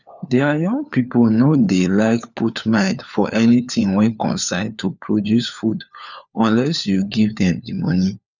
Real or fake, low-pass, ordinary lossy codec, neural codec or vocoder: fake; 7.2 kHz; none; codec, 16 kHz, 4 kbps, FunCodec, trained on LibriTTS, 50 frames a second